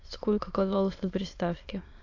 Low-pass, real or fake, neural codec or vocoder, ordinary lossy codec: 7.2 kHz; fake; autoencoder, 22.05 kHz, a latent of 192 numbers a frame, VITS, trained on many speakers; AAC, 48 kbps